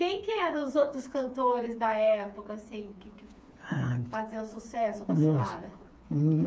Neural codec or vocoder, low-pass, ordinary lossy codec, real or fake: codec, 16 kHz, 4 kbps, FreqCodec, smaller model; none; none; fake